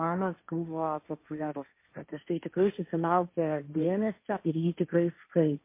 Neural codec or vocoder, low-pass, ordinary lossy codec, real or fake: codec, 16 kHz, 1.1 kbps, Voila-Tokenizer; 3.6 kHz; MP3, 24 kbps; fake